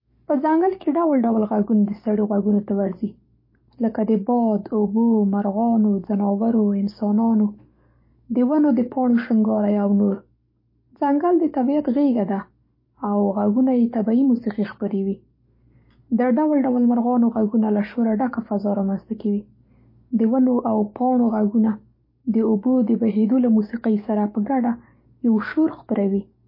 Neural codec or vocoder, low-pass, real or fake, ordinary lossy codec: codec, 44.1 kHz, 7.8 kbps, DAC; 5.4 kHz; fake; MP3, 24 kbps